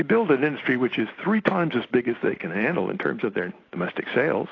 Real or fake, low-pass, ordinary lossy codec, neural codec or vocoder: real; 7.2 kHz; AAC, 32 kbps; none